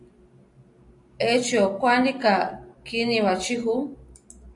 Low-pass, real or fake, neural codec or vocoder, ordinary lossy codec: 10.8 kHz; real; none; AAC, 64 kbps